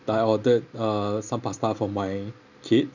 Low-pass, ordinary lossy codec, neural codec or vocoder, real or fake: 7.2 kHz; none; none; real